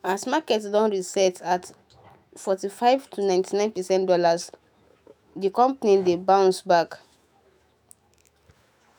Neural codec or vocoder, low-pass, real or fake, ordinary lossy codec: autoencoder, 48 kHz, 128 numbers a frame, DAC-VAE, trained on Japanese speech; none; fake; none